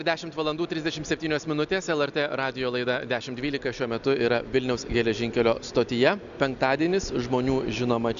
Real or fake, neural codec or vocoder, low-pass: real; none; 7.2 kHz